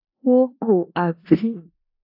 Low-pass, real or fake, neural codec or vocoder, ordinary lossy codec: 5.4 kHz; fake; codec, 16 kHz in and 24 kHz out, 0.4 kbps, LongCat-Audio-Codec, four codebook decoder; AAC, 48 kbps